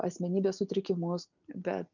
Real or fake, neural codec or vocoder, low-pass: real; none; 7.2 kHz